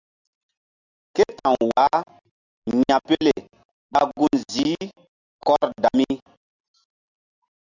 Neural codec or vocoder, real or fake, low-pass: none; real; 7.2 kHz